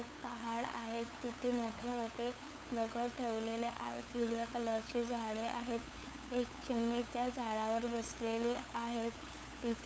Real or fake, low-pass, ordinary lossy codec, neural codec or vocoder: fake; none; none; codec, 16 kHz, 8 kbps, FunCodec, trained on LibriTTS, 25 frames a second